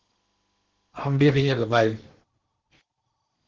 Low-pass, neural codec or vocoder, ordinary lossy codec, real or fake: 7.2 kHz; codec, 16 kHz in and 24 kHz out, 0.8 kbps, FocalCodec, streaming, 65536 codes; Opus, 16 kbps; fake